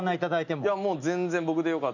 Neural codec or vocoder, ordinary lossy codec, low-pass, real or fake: none; none; 7.2 kHz; real